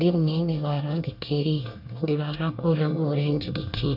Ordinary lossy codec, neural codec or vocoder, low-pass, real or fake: none; codec, 24 kHz, 1 kbps, SNAC; 5.4 kHz; fake